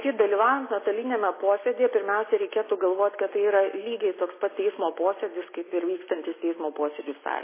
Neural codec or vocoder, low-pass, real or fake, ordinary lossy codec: none; 3.6 kHz; real; MP3, 16 kbps